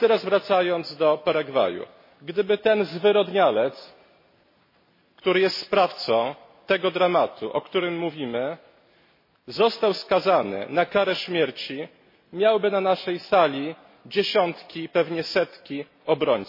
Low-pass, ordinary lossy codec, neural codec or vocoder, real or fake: 5.4 kHz; MP3, 24 kbps; none; real